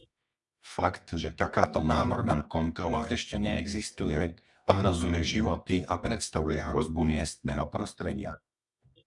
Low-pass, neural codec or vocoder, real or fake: 10.8 kHz; codec, 24 kHz, 0.9 kbps, WavTokenizer, medium music audio release; fake